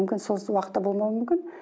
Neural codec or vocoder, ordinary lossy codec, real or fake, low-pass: none; none; real; none